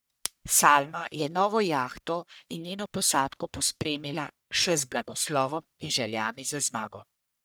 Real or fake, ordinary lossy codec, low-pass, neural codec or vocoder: fake; none; none; codec, 44.1 kHz, 1.7 kbps, Pupu-Codec